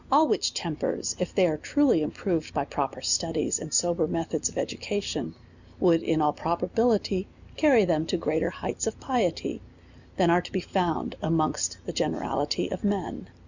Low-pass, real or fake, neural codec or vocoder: 7.2 kHz; real; none